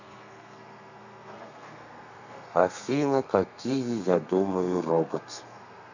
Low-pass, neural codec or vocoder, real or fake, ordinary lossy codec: 7.2 kHz; codec, 32 kHz, 1.9 kbps, SNAC; fake; none